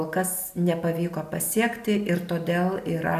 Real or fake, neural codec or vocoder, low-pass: real; none; 14.4 kHz